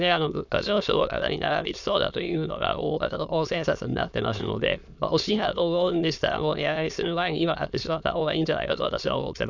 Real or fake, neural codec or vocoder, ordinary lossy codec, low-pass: fake; autoencoder, 22.05 kHz, a latent of 192 numbers a frame, VITS, trained on many speakers; none; 7.2 kHz